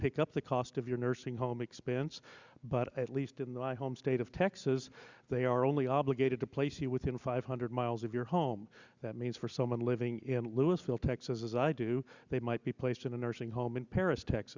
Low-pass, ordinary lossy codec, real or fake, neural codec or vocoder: 7.2 kHz; Opus, 64 kbps; real; none